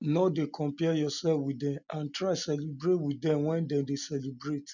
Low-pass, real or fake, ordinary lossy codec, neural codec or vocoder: 7.2 kHz; real; none; none